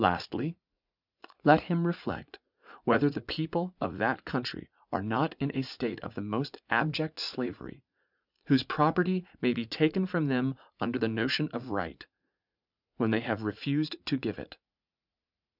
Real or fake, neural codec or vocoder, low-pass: fake; vocoder, 44.1 kHz, 80 mel bands, Vocos; 5.4 kHz